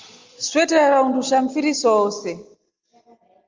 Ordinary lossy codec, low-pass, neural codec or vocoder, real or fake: Opus, 32 kbps; 7.2 kHz; none; real